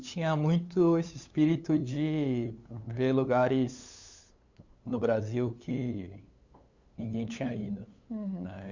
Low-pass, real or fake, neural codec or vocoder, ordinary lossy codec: 7.2 kHz; fake; codec, 16 kHz, 8 kbps, FunCodec, trained on LibriTTS, 25 frames a second; Opus, 64 kbps